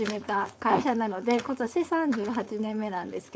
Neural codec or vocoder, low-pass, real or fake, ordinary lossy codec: codec, 16 kHz, 4.8 kbps, FACodec; none; fake; none